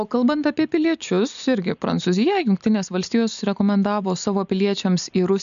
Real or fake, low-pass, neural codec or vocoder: real; 7.2 kHz; none